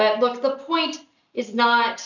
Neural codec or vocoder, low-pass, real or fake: none; 7.2 kHz; real